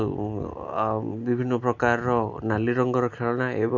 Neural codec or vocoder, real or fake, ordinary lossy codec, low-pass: none; real; none; 7.2 kHz